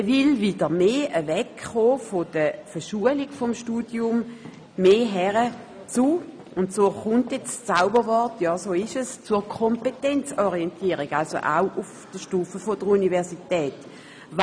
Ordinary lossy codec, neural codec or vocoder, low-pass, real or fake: none; none; 9.9 kHz; real